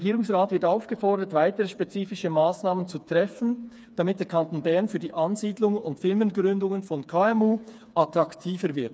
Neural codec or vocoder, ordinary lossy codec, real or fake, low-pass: codec, 16 kHz, 4 kbps, FreqCodec, smaller model; none; fake; none